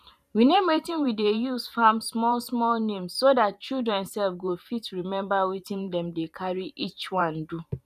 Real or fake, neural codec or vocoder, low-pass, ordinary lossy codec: fake; vocoder, 44.1 kHz, 128 mel bands every 512 samples, BigVGAN v2; 14.4 kHz; none